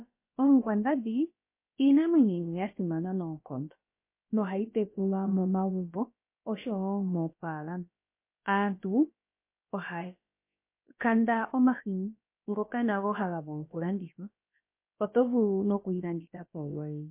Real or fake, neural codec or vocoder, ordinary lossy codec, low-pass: fake; codec, 16 kHz, about 1 kbps, DyCAST, with the encoder's durations; MP3, 24 kbps; 3.6 kHz